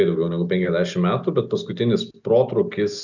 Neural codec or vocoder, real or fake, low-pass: none; real; 7.2 kHz